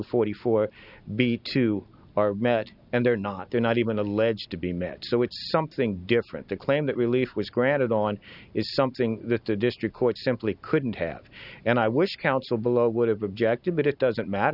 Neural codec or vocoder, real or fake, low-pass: none; real; 5.4 kHz